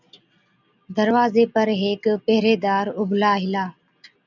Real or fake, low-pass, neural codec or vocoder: real; 7.2 kHz; none